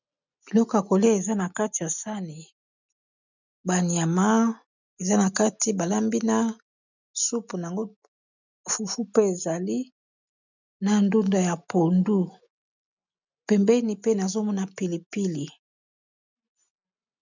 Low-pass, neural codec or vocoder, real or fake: 7.2 kHz; none; real